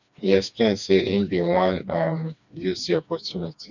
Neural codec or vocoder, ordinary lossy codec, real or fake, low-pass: codec, 16 kHz, 2 kbps, FreqCodec, smaller model; none; fake; 7.2 kHz